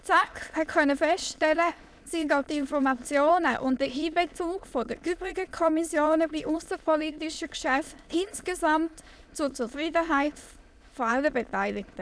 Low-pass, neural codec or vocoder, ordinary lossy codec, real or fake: none; autoencoder, 22.05 kHz, a latent of 192 numbers a frame, VITS, trained on many speakers; none; fake